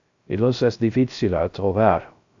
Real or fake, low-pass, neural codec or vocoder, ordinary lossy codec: fake; 7.2 kHz; codec, 16 kHz, 0.3 kbps, FocalCodec; AAC, 64 kbps